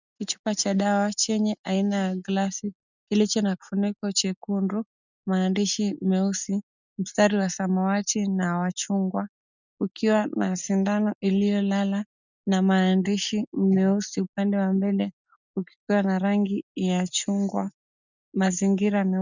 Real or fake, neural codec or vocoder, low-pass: real; none; 7.2 kHz